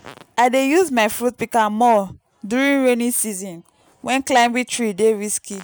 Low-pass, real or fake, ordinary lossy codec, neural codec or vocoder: none; real; none; none